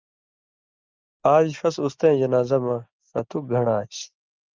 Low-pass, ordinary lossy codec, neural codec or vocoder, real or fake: 7.2 kHz; Opus, 24 kbps; none; real